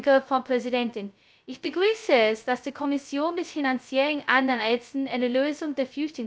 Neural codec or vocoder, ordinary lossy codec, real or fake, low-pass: codec, 16 kHz, 0.2 kbps, FocalCodec; none; fake; none